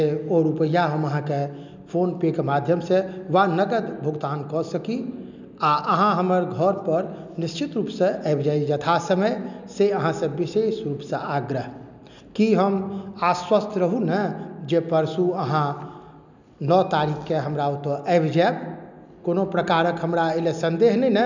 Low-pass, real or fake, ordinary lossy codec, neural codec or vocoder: 7.2 kHz; real; none; none